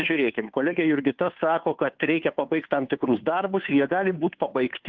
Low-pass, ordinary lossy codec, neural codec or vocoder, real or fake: 7.2 kHz; Opus, 24 kbps; codec, 16 kHz, 2 kbps, FunCodec, trained on Chinese and English, 25 frames a second; fake